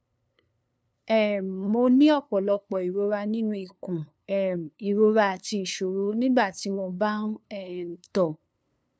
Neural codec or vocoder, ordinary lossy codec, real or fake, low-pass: codec, 16 kHz, 2 kbps, FunCodec, trained on LibriTTS, 25 frames a second; none; fake; none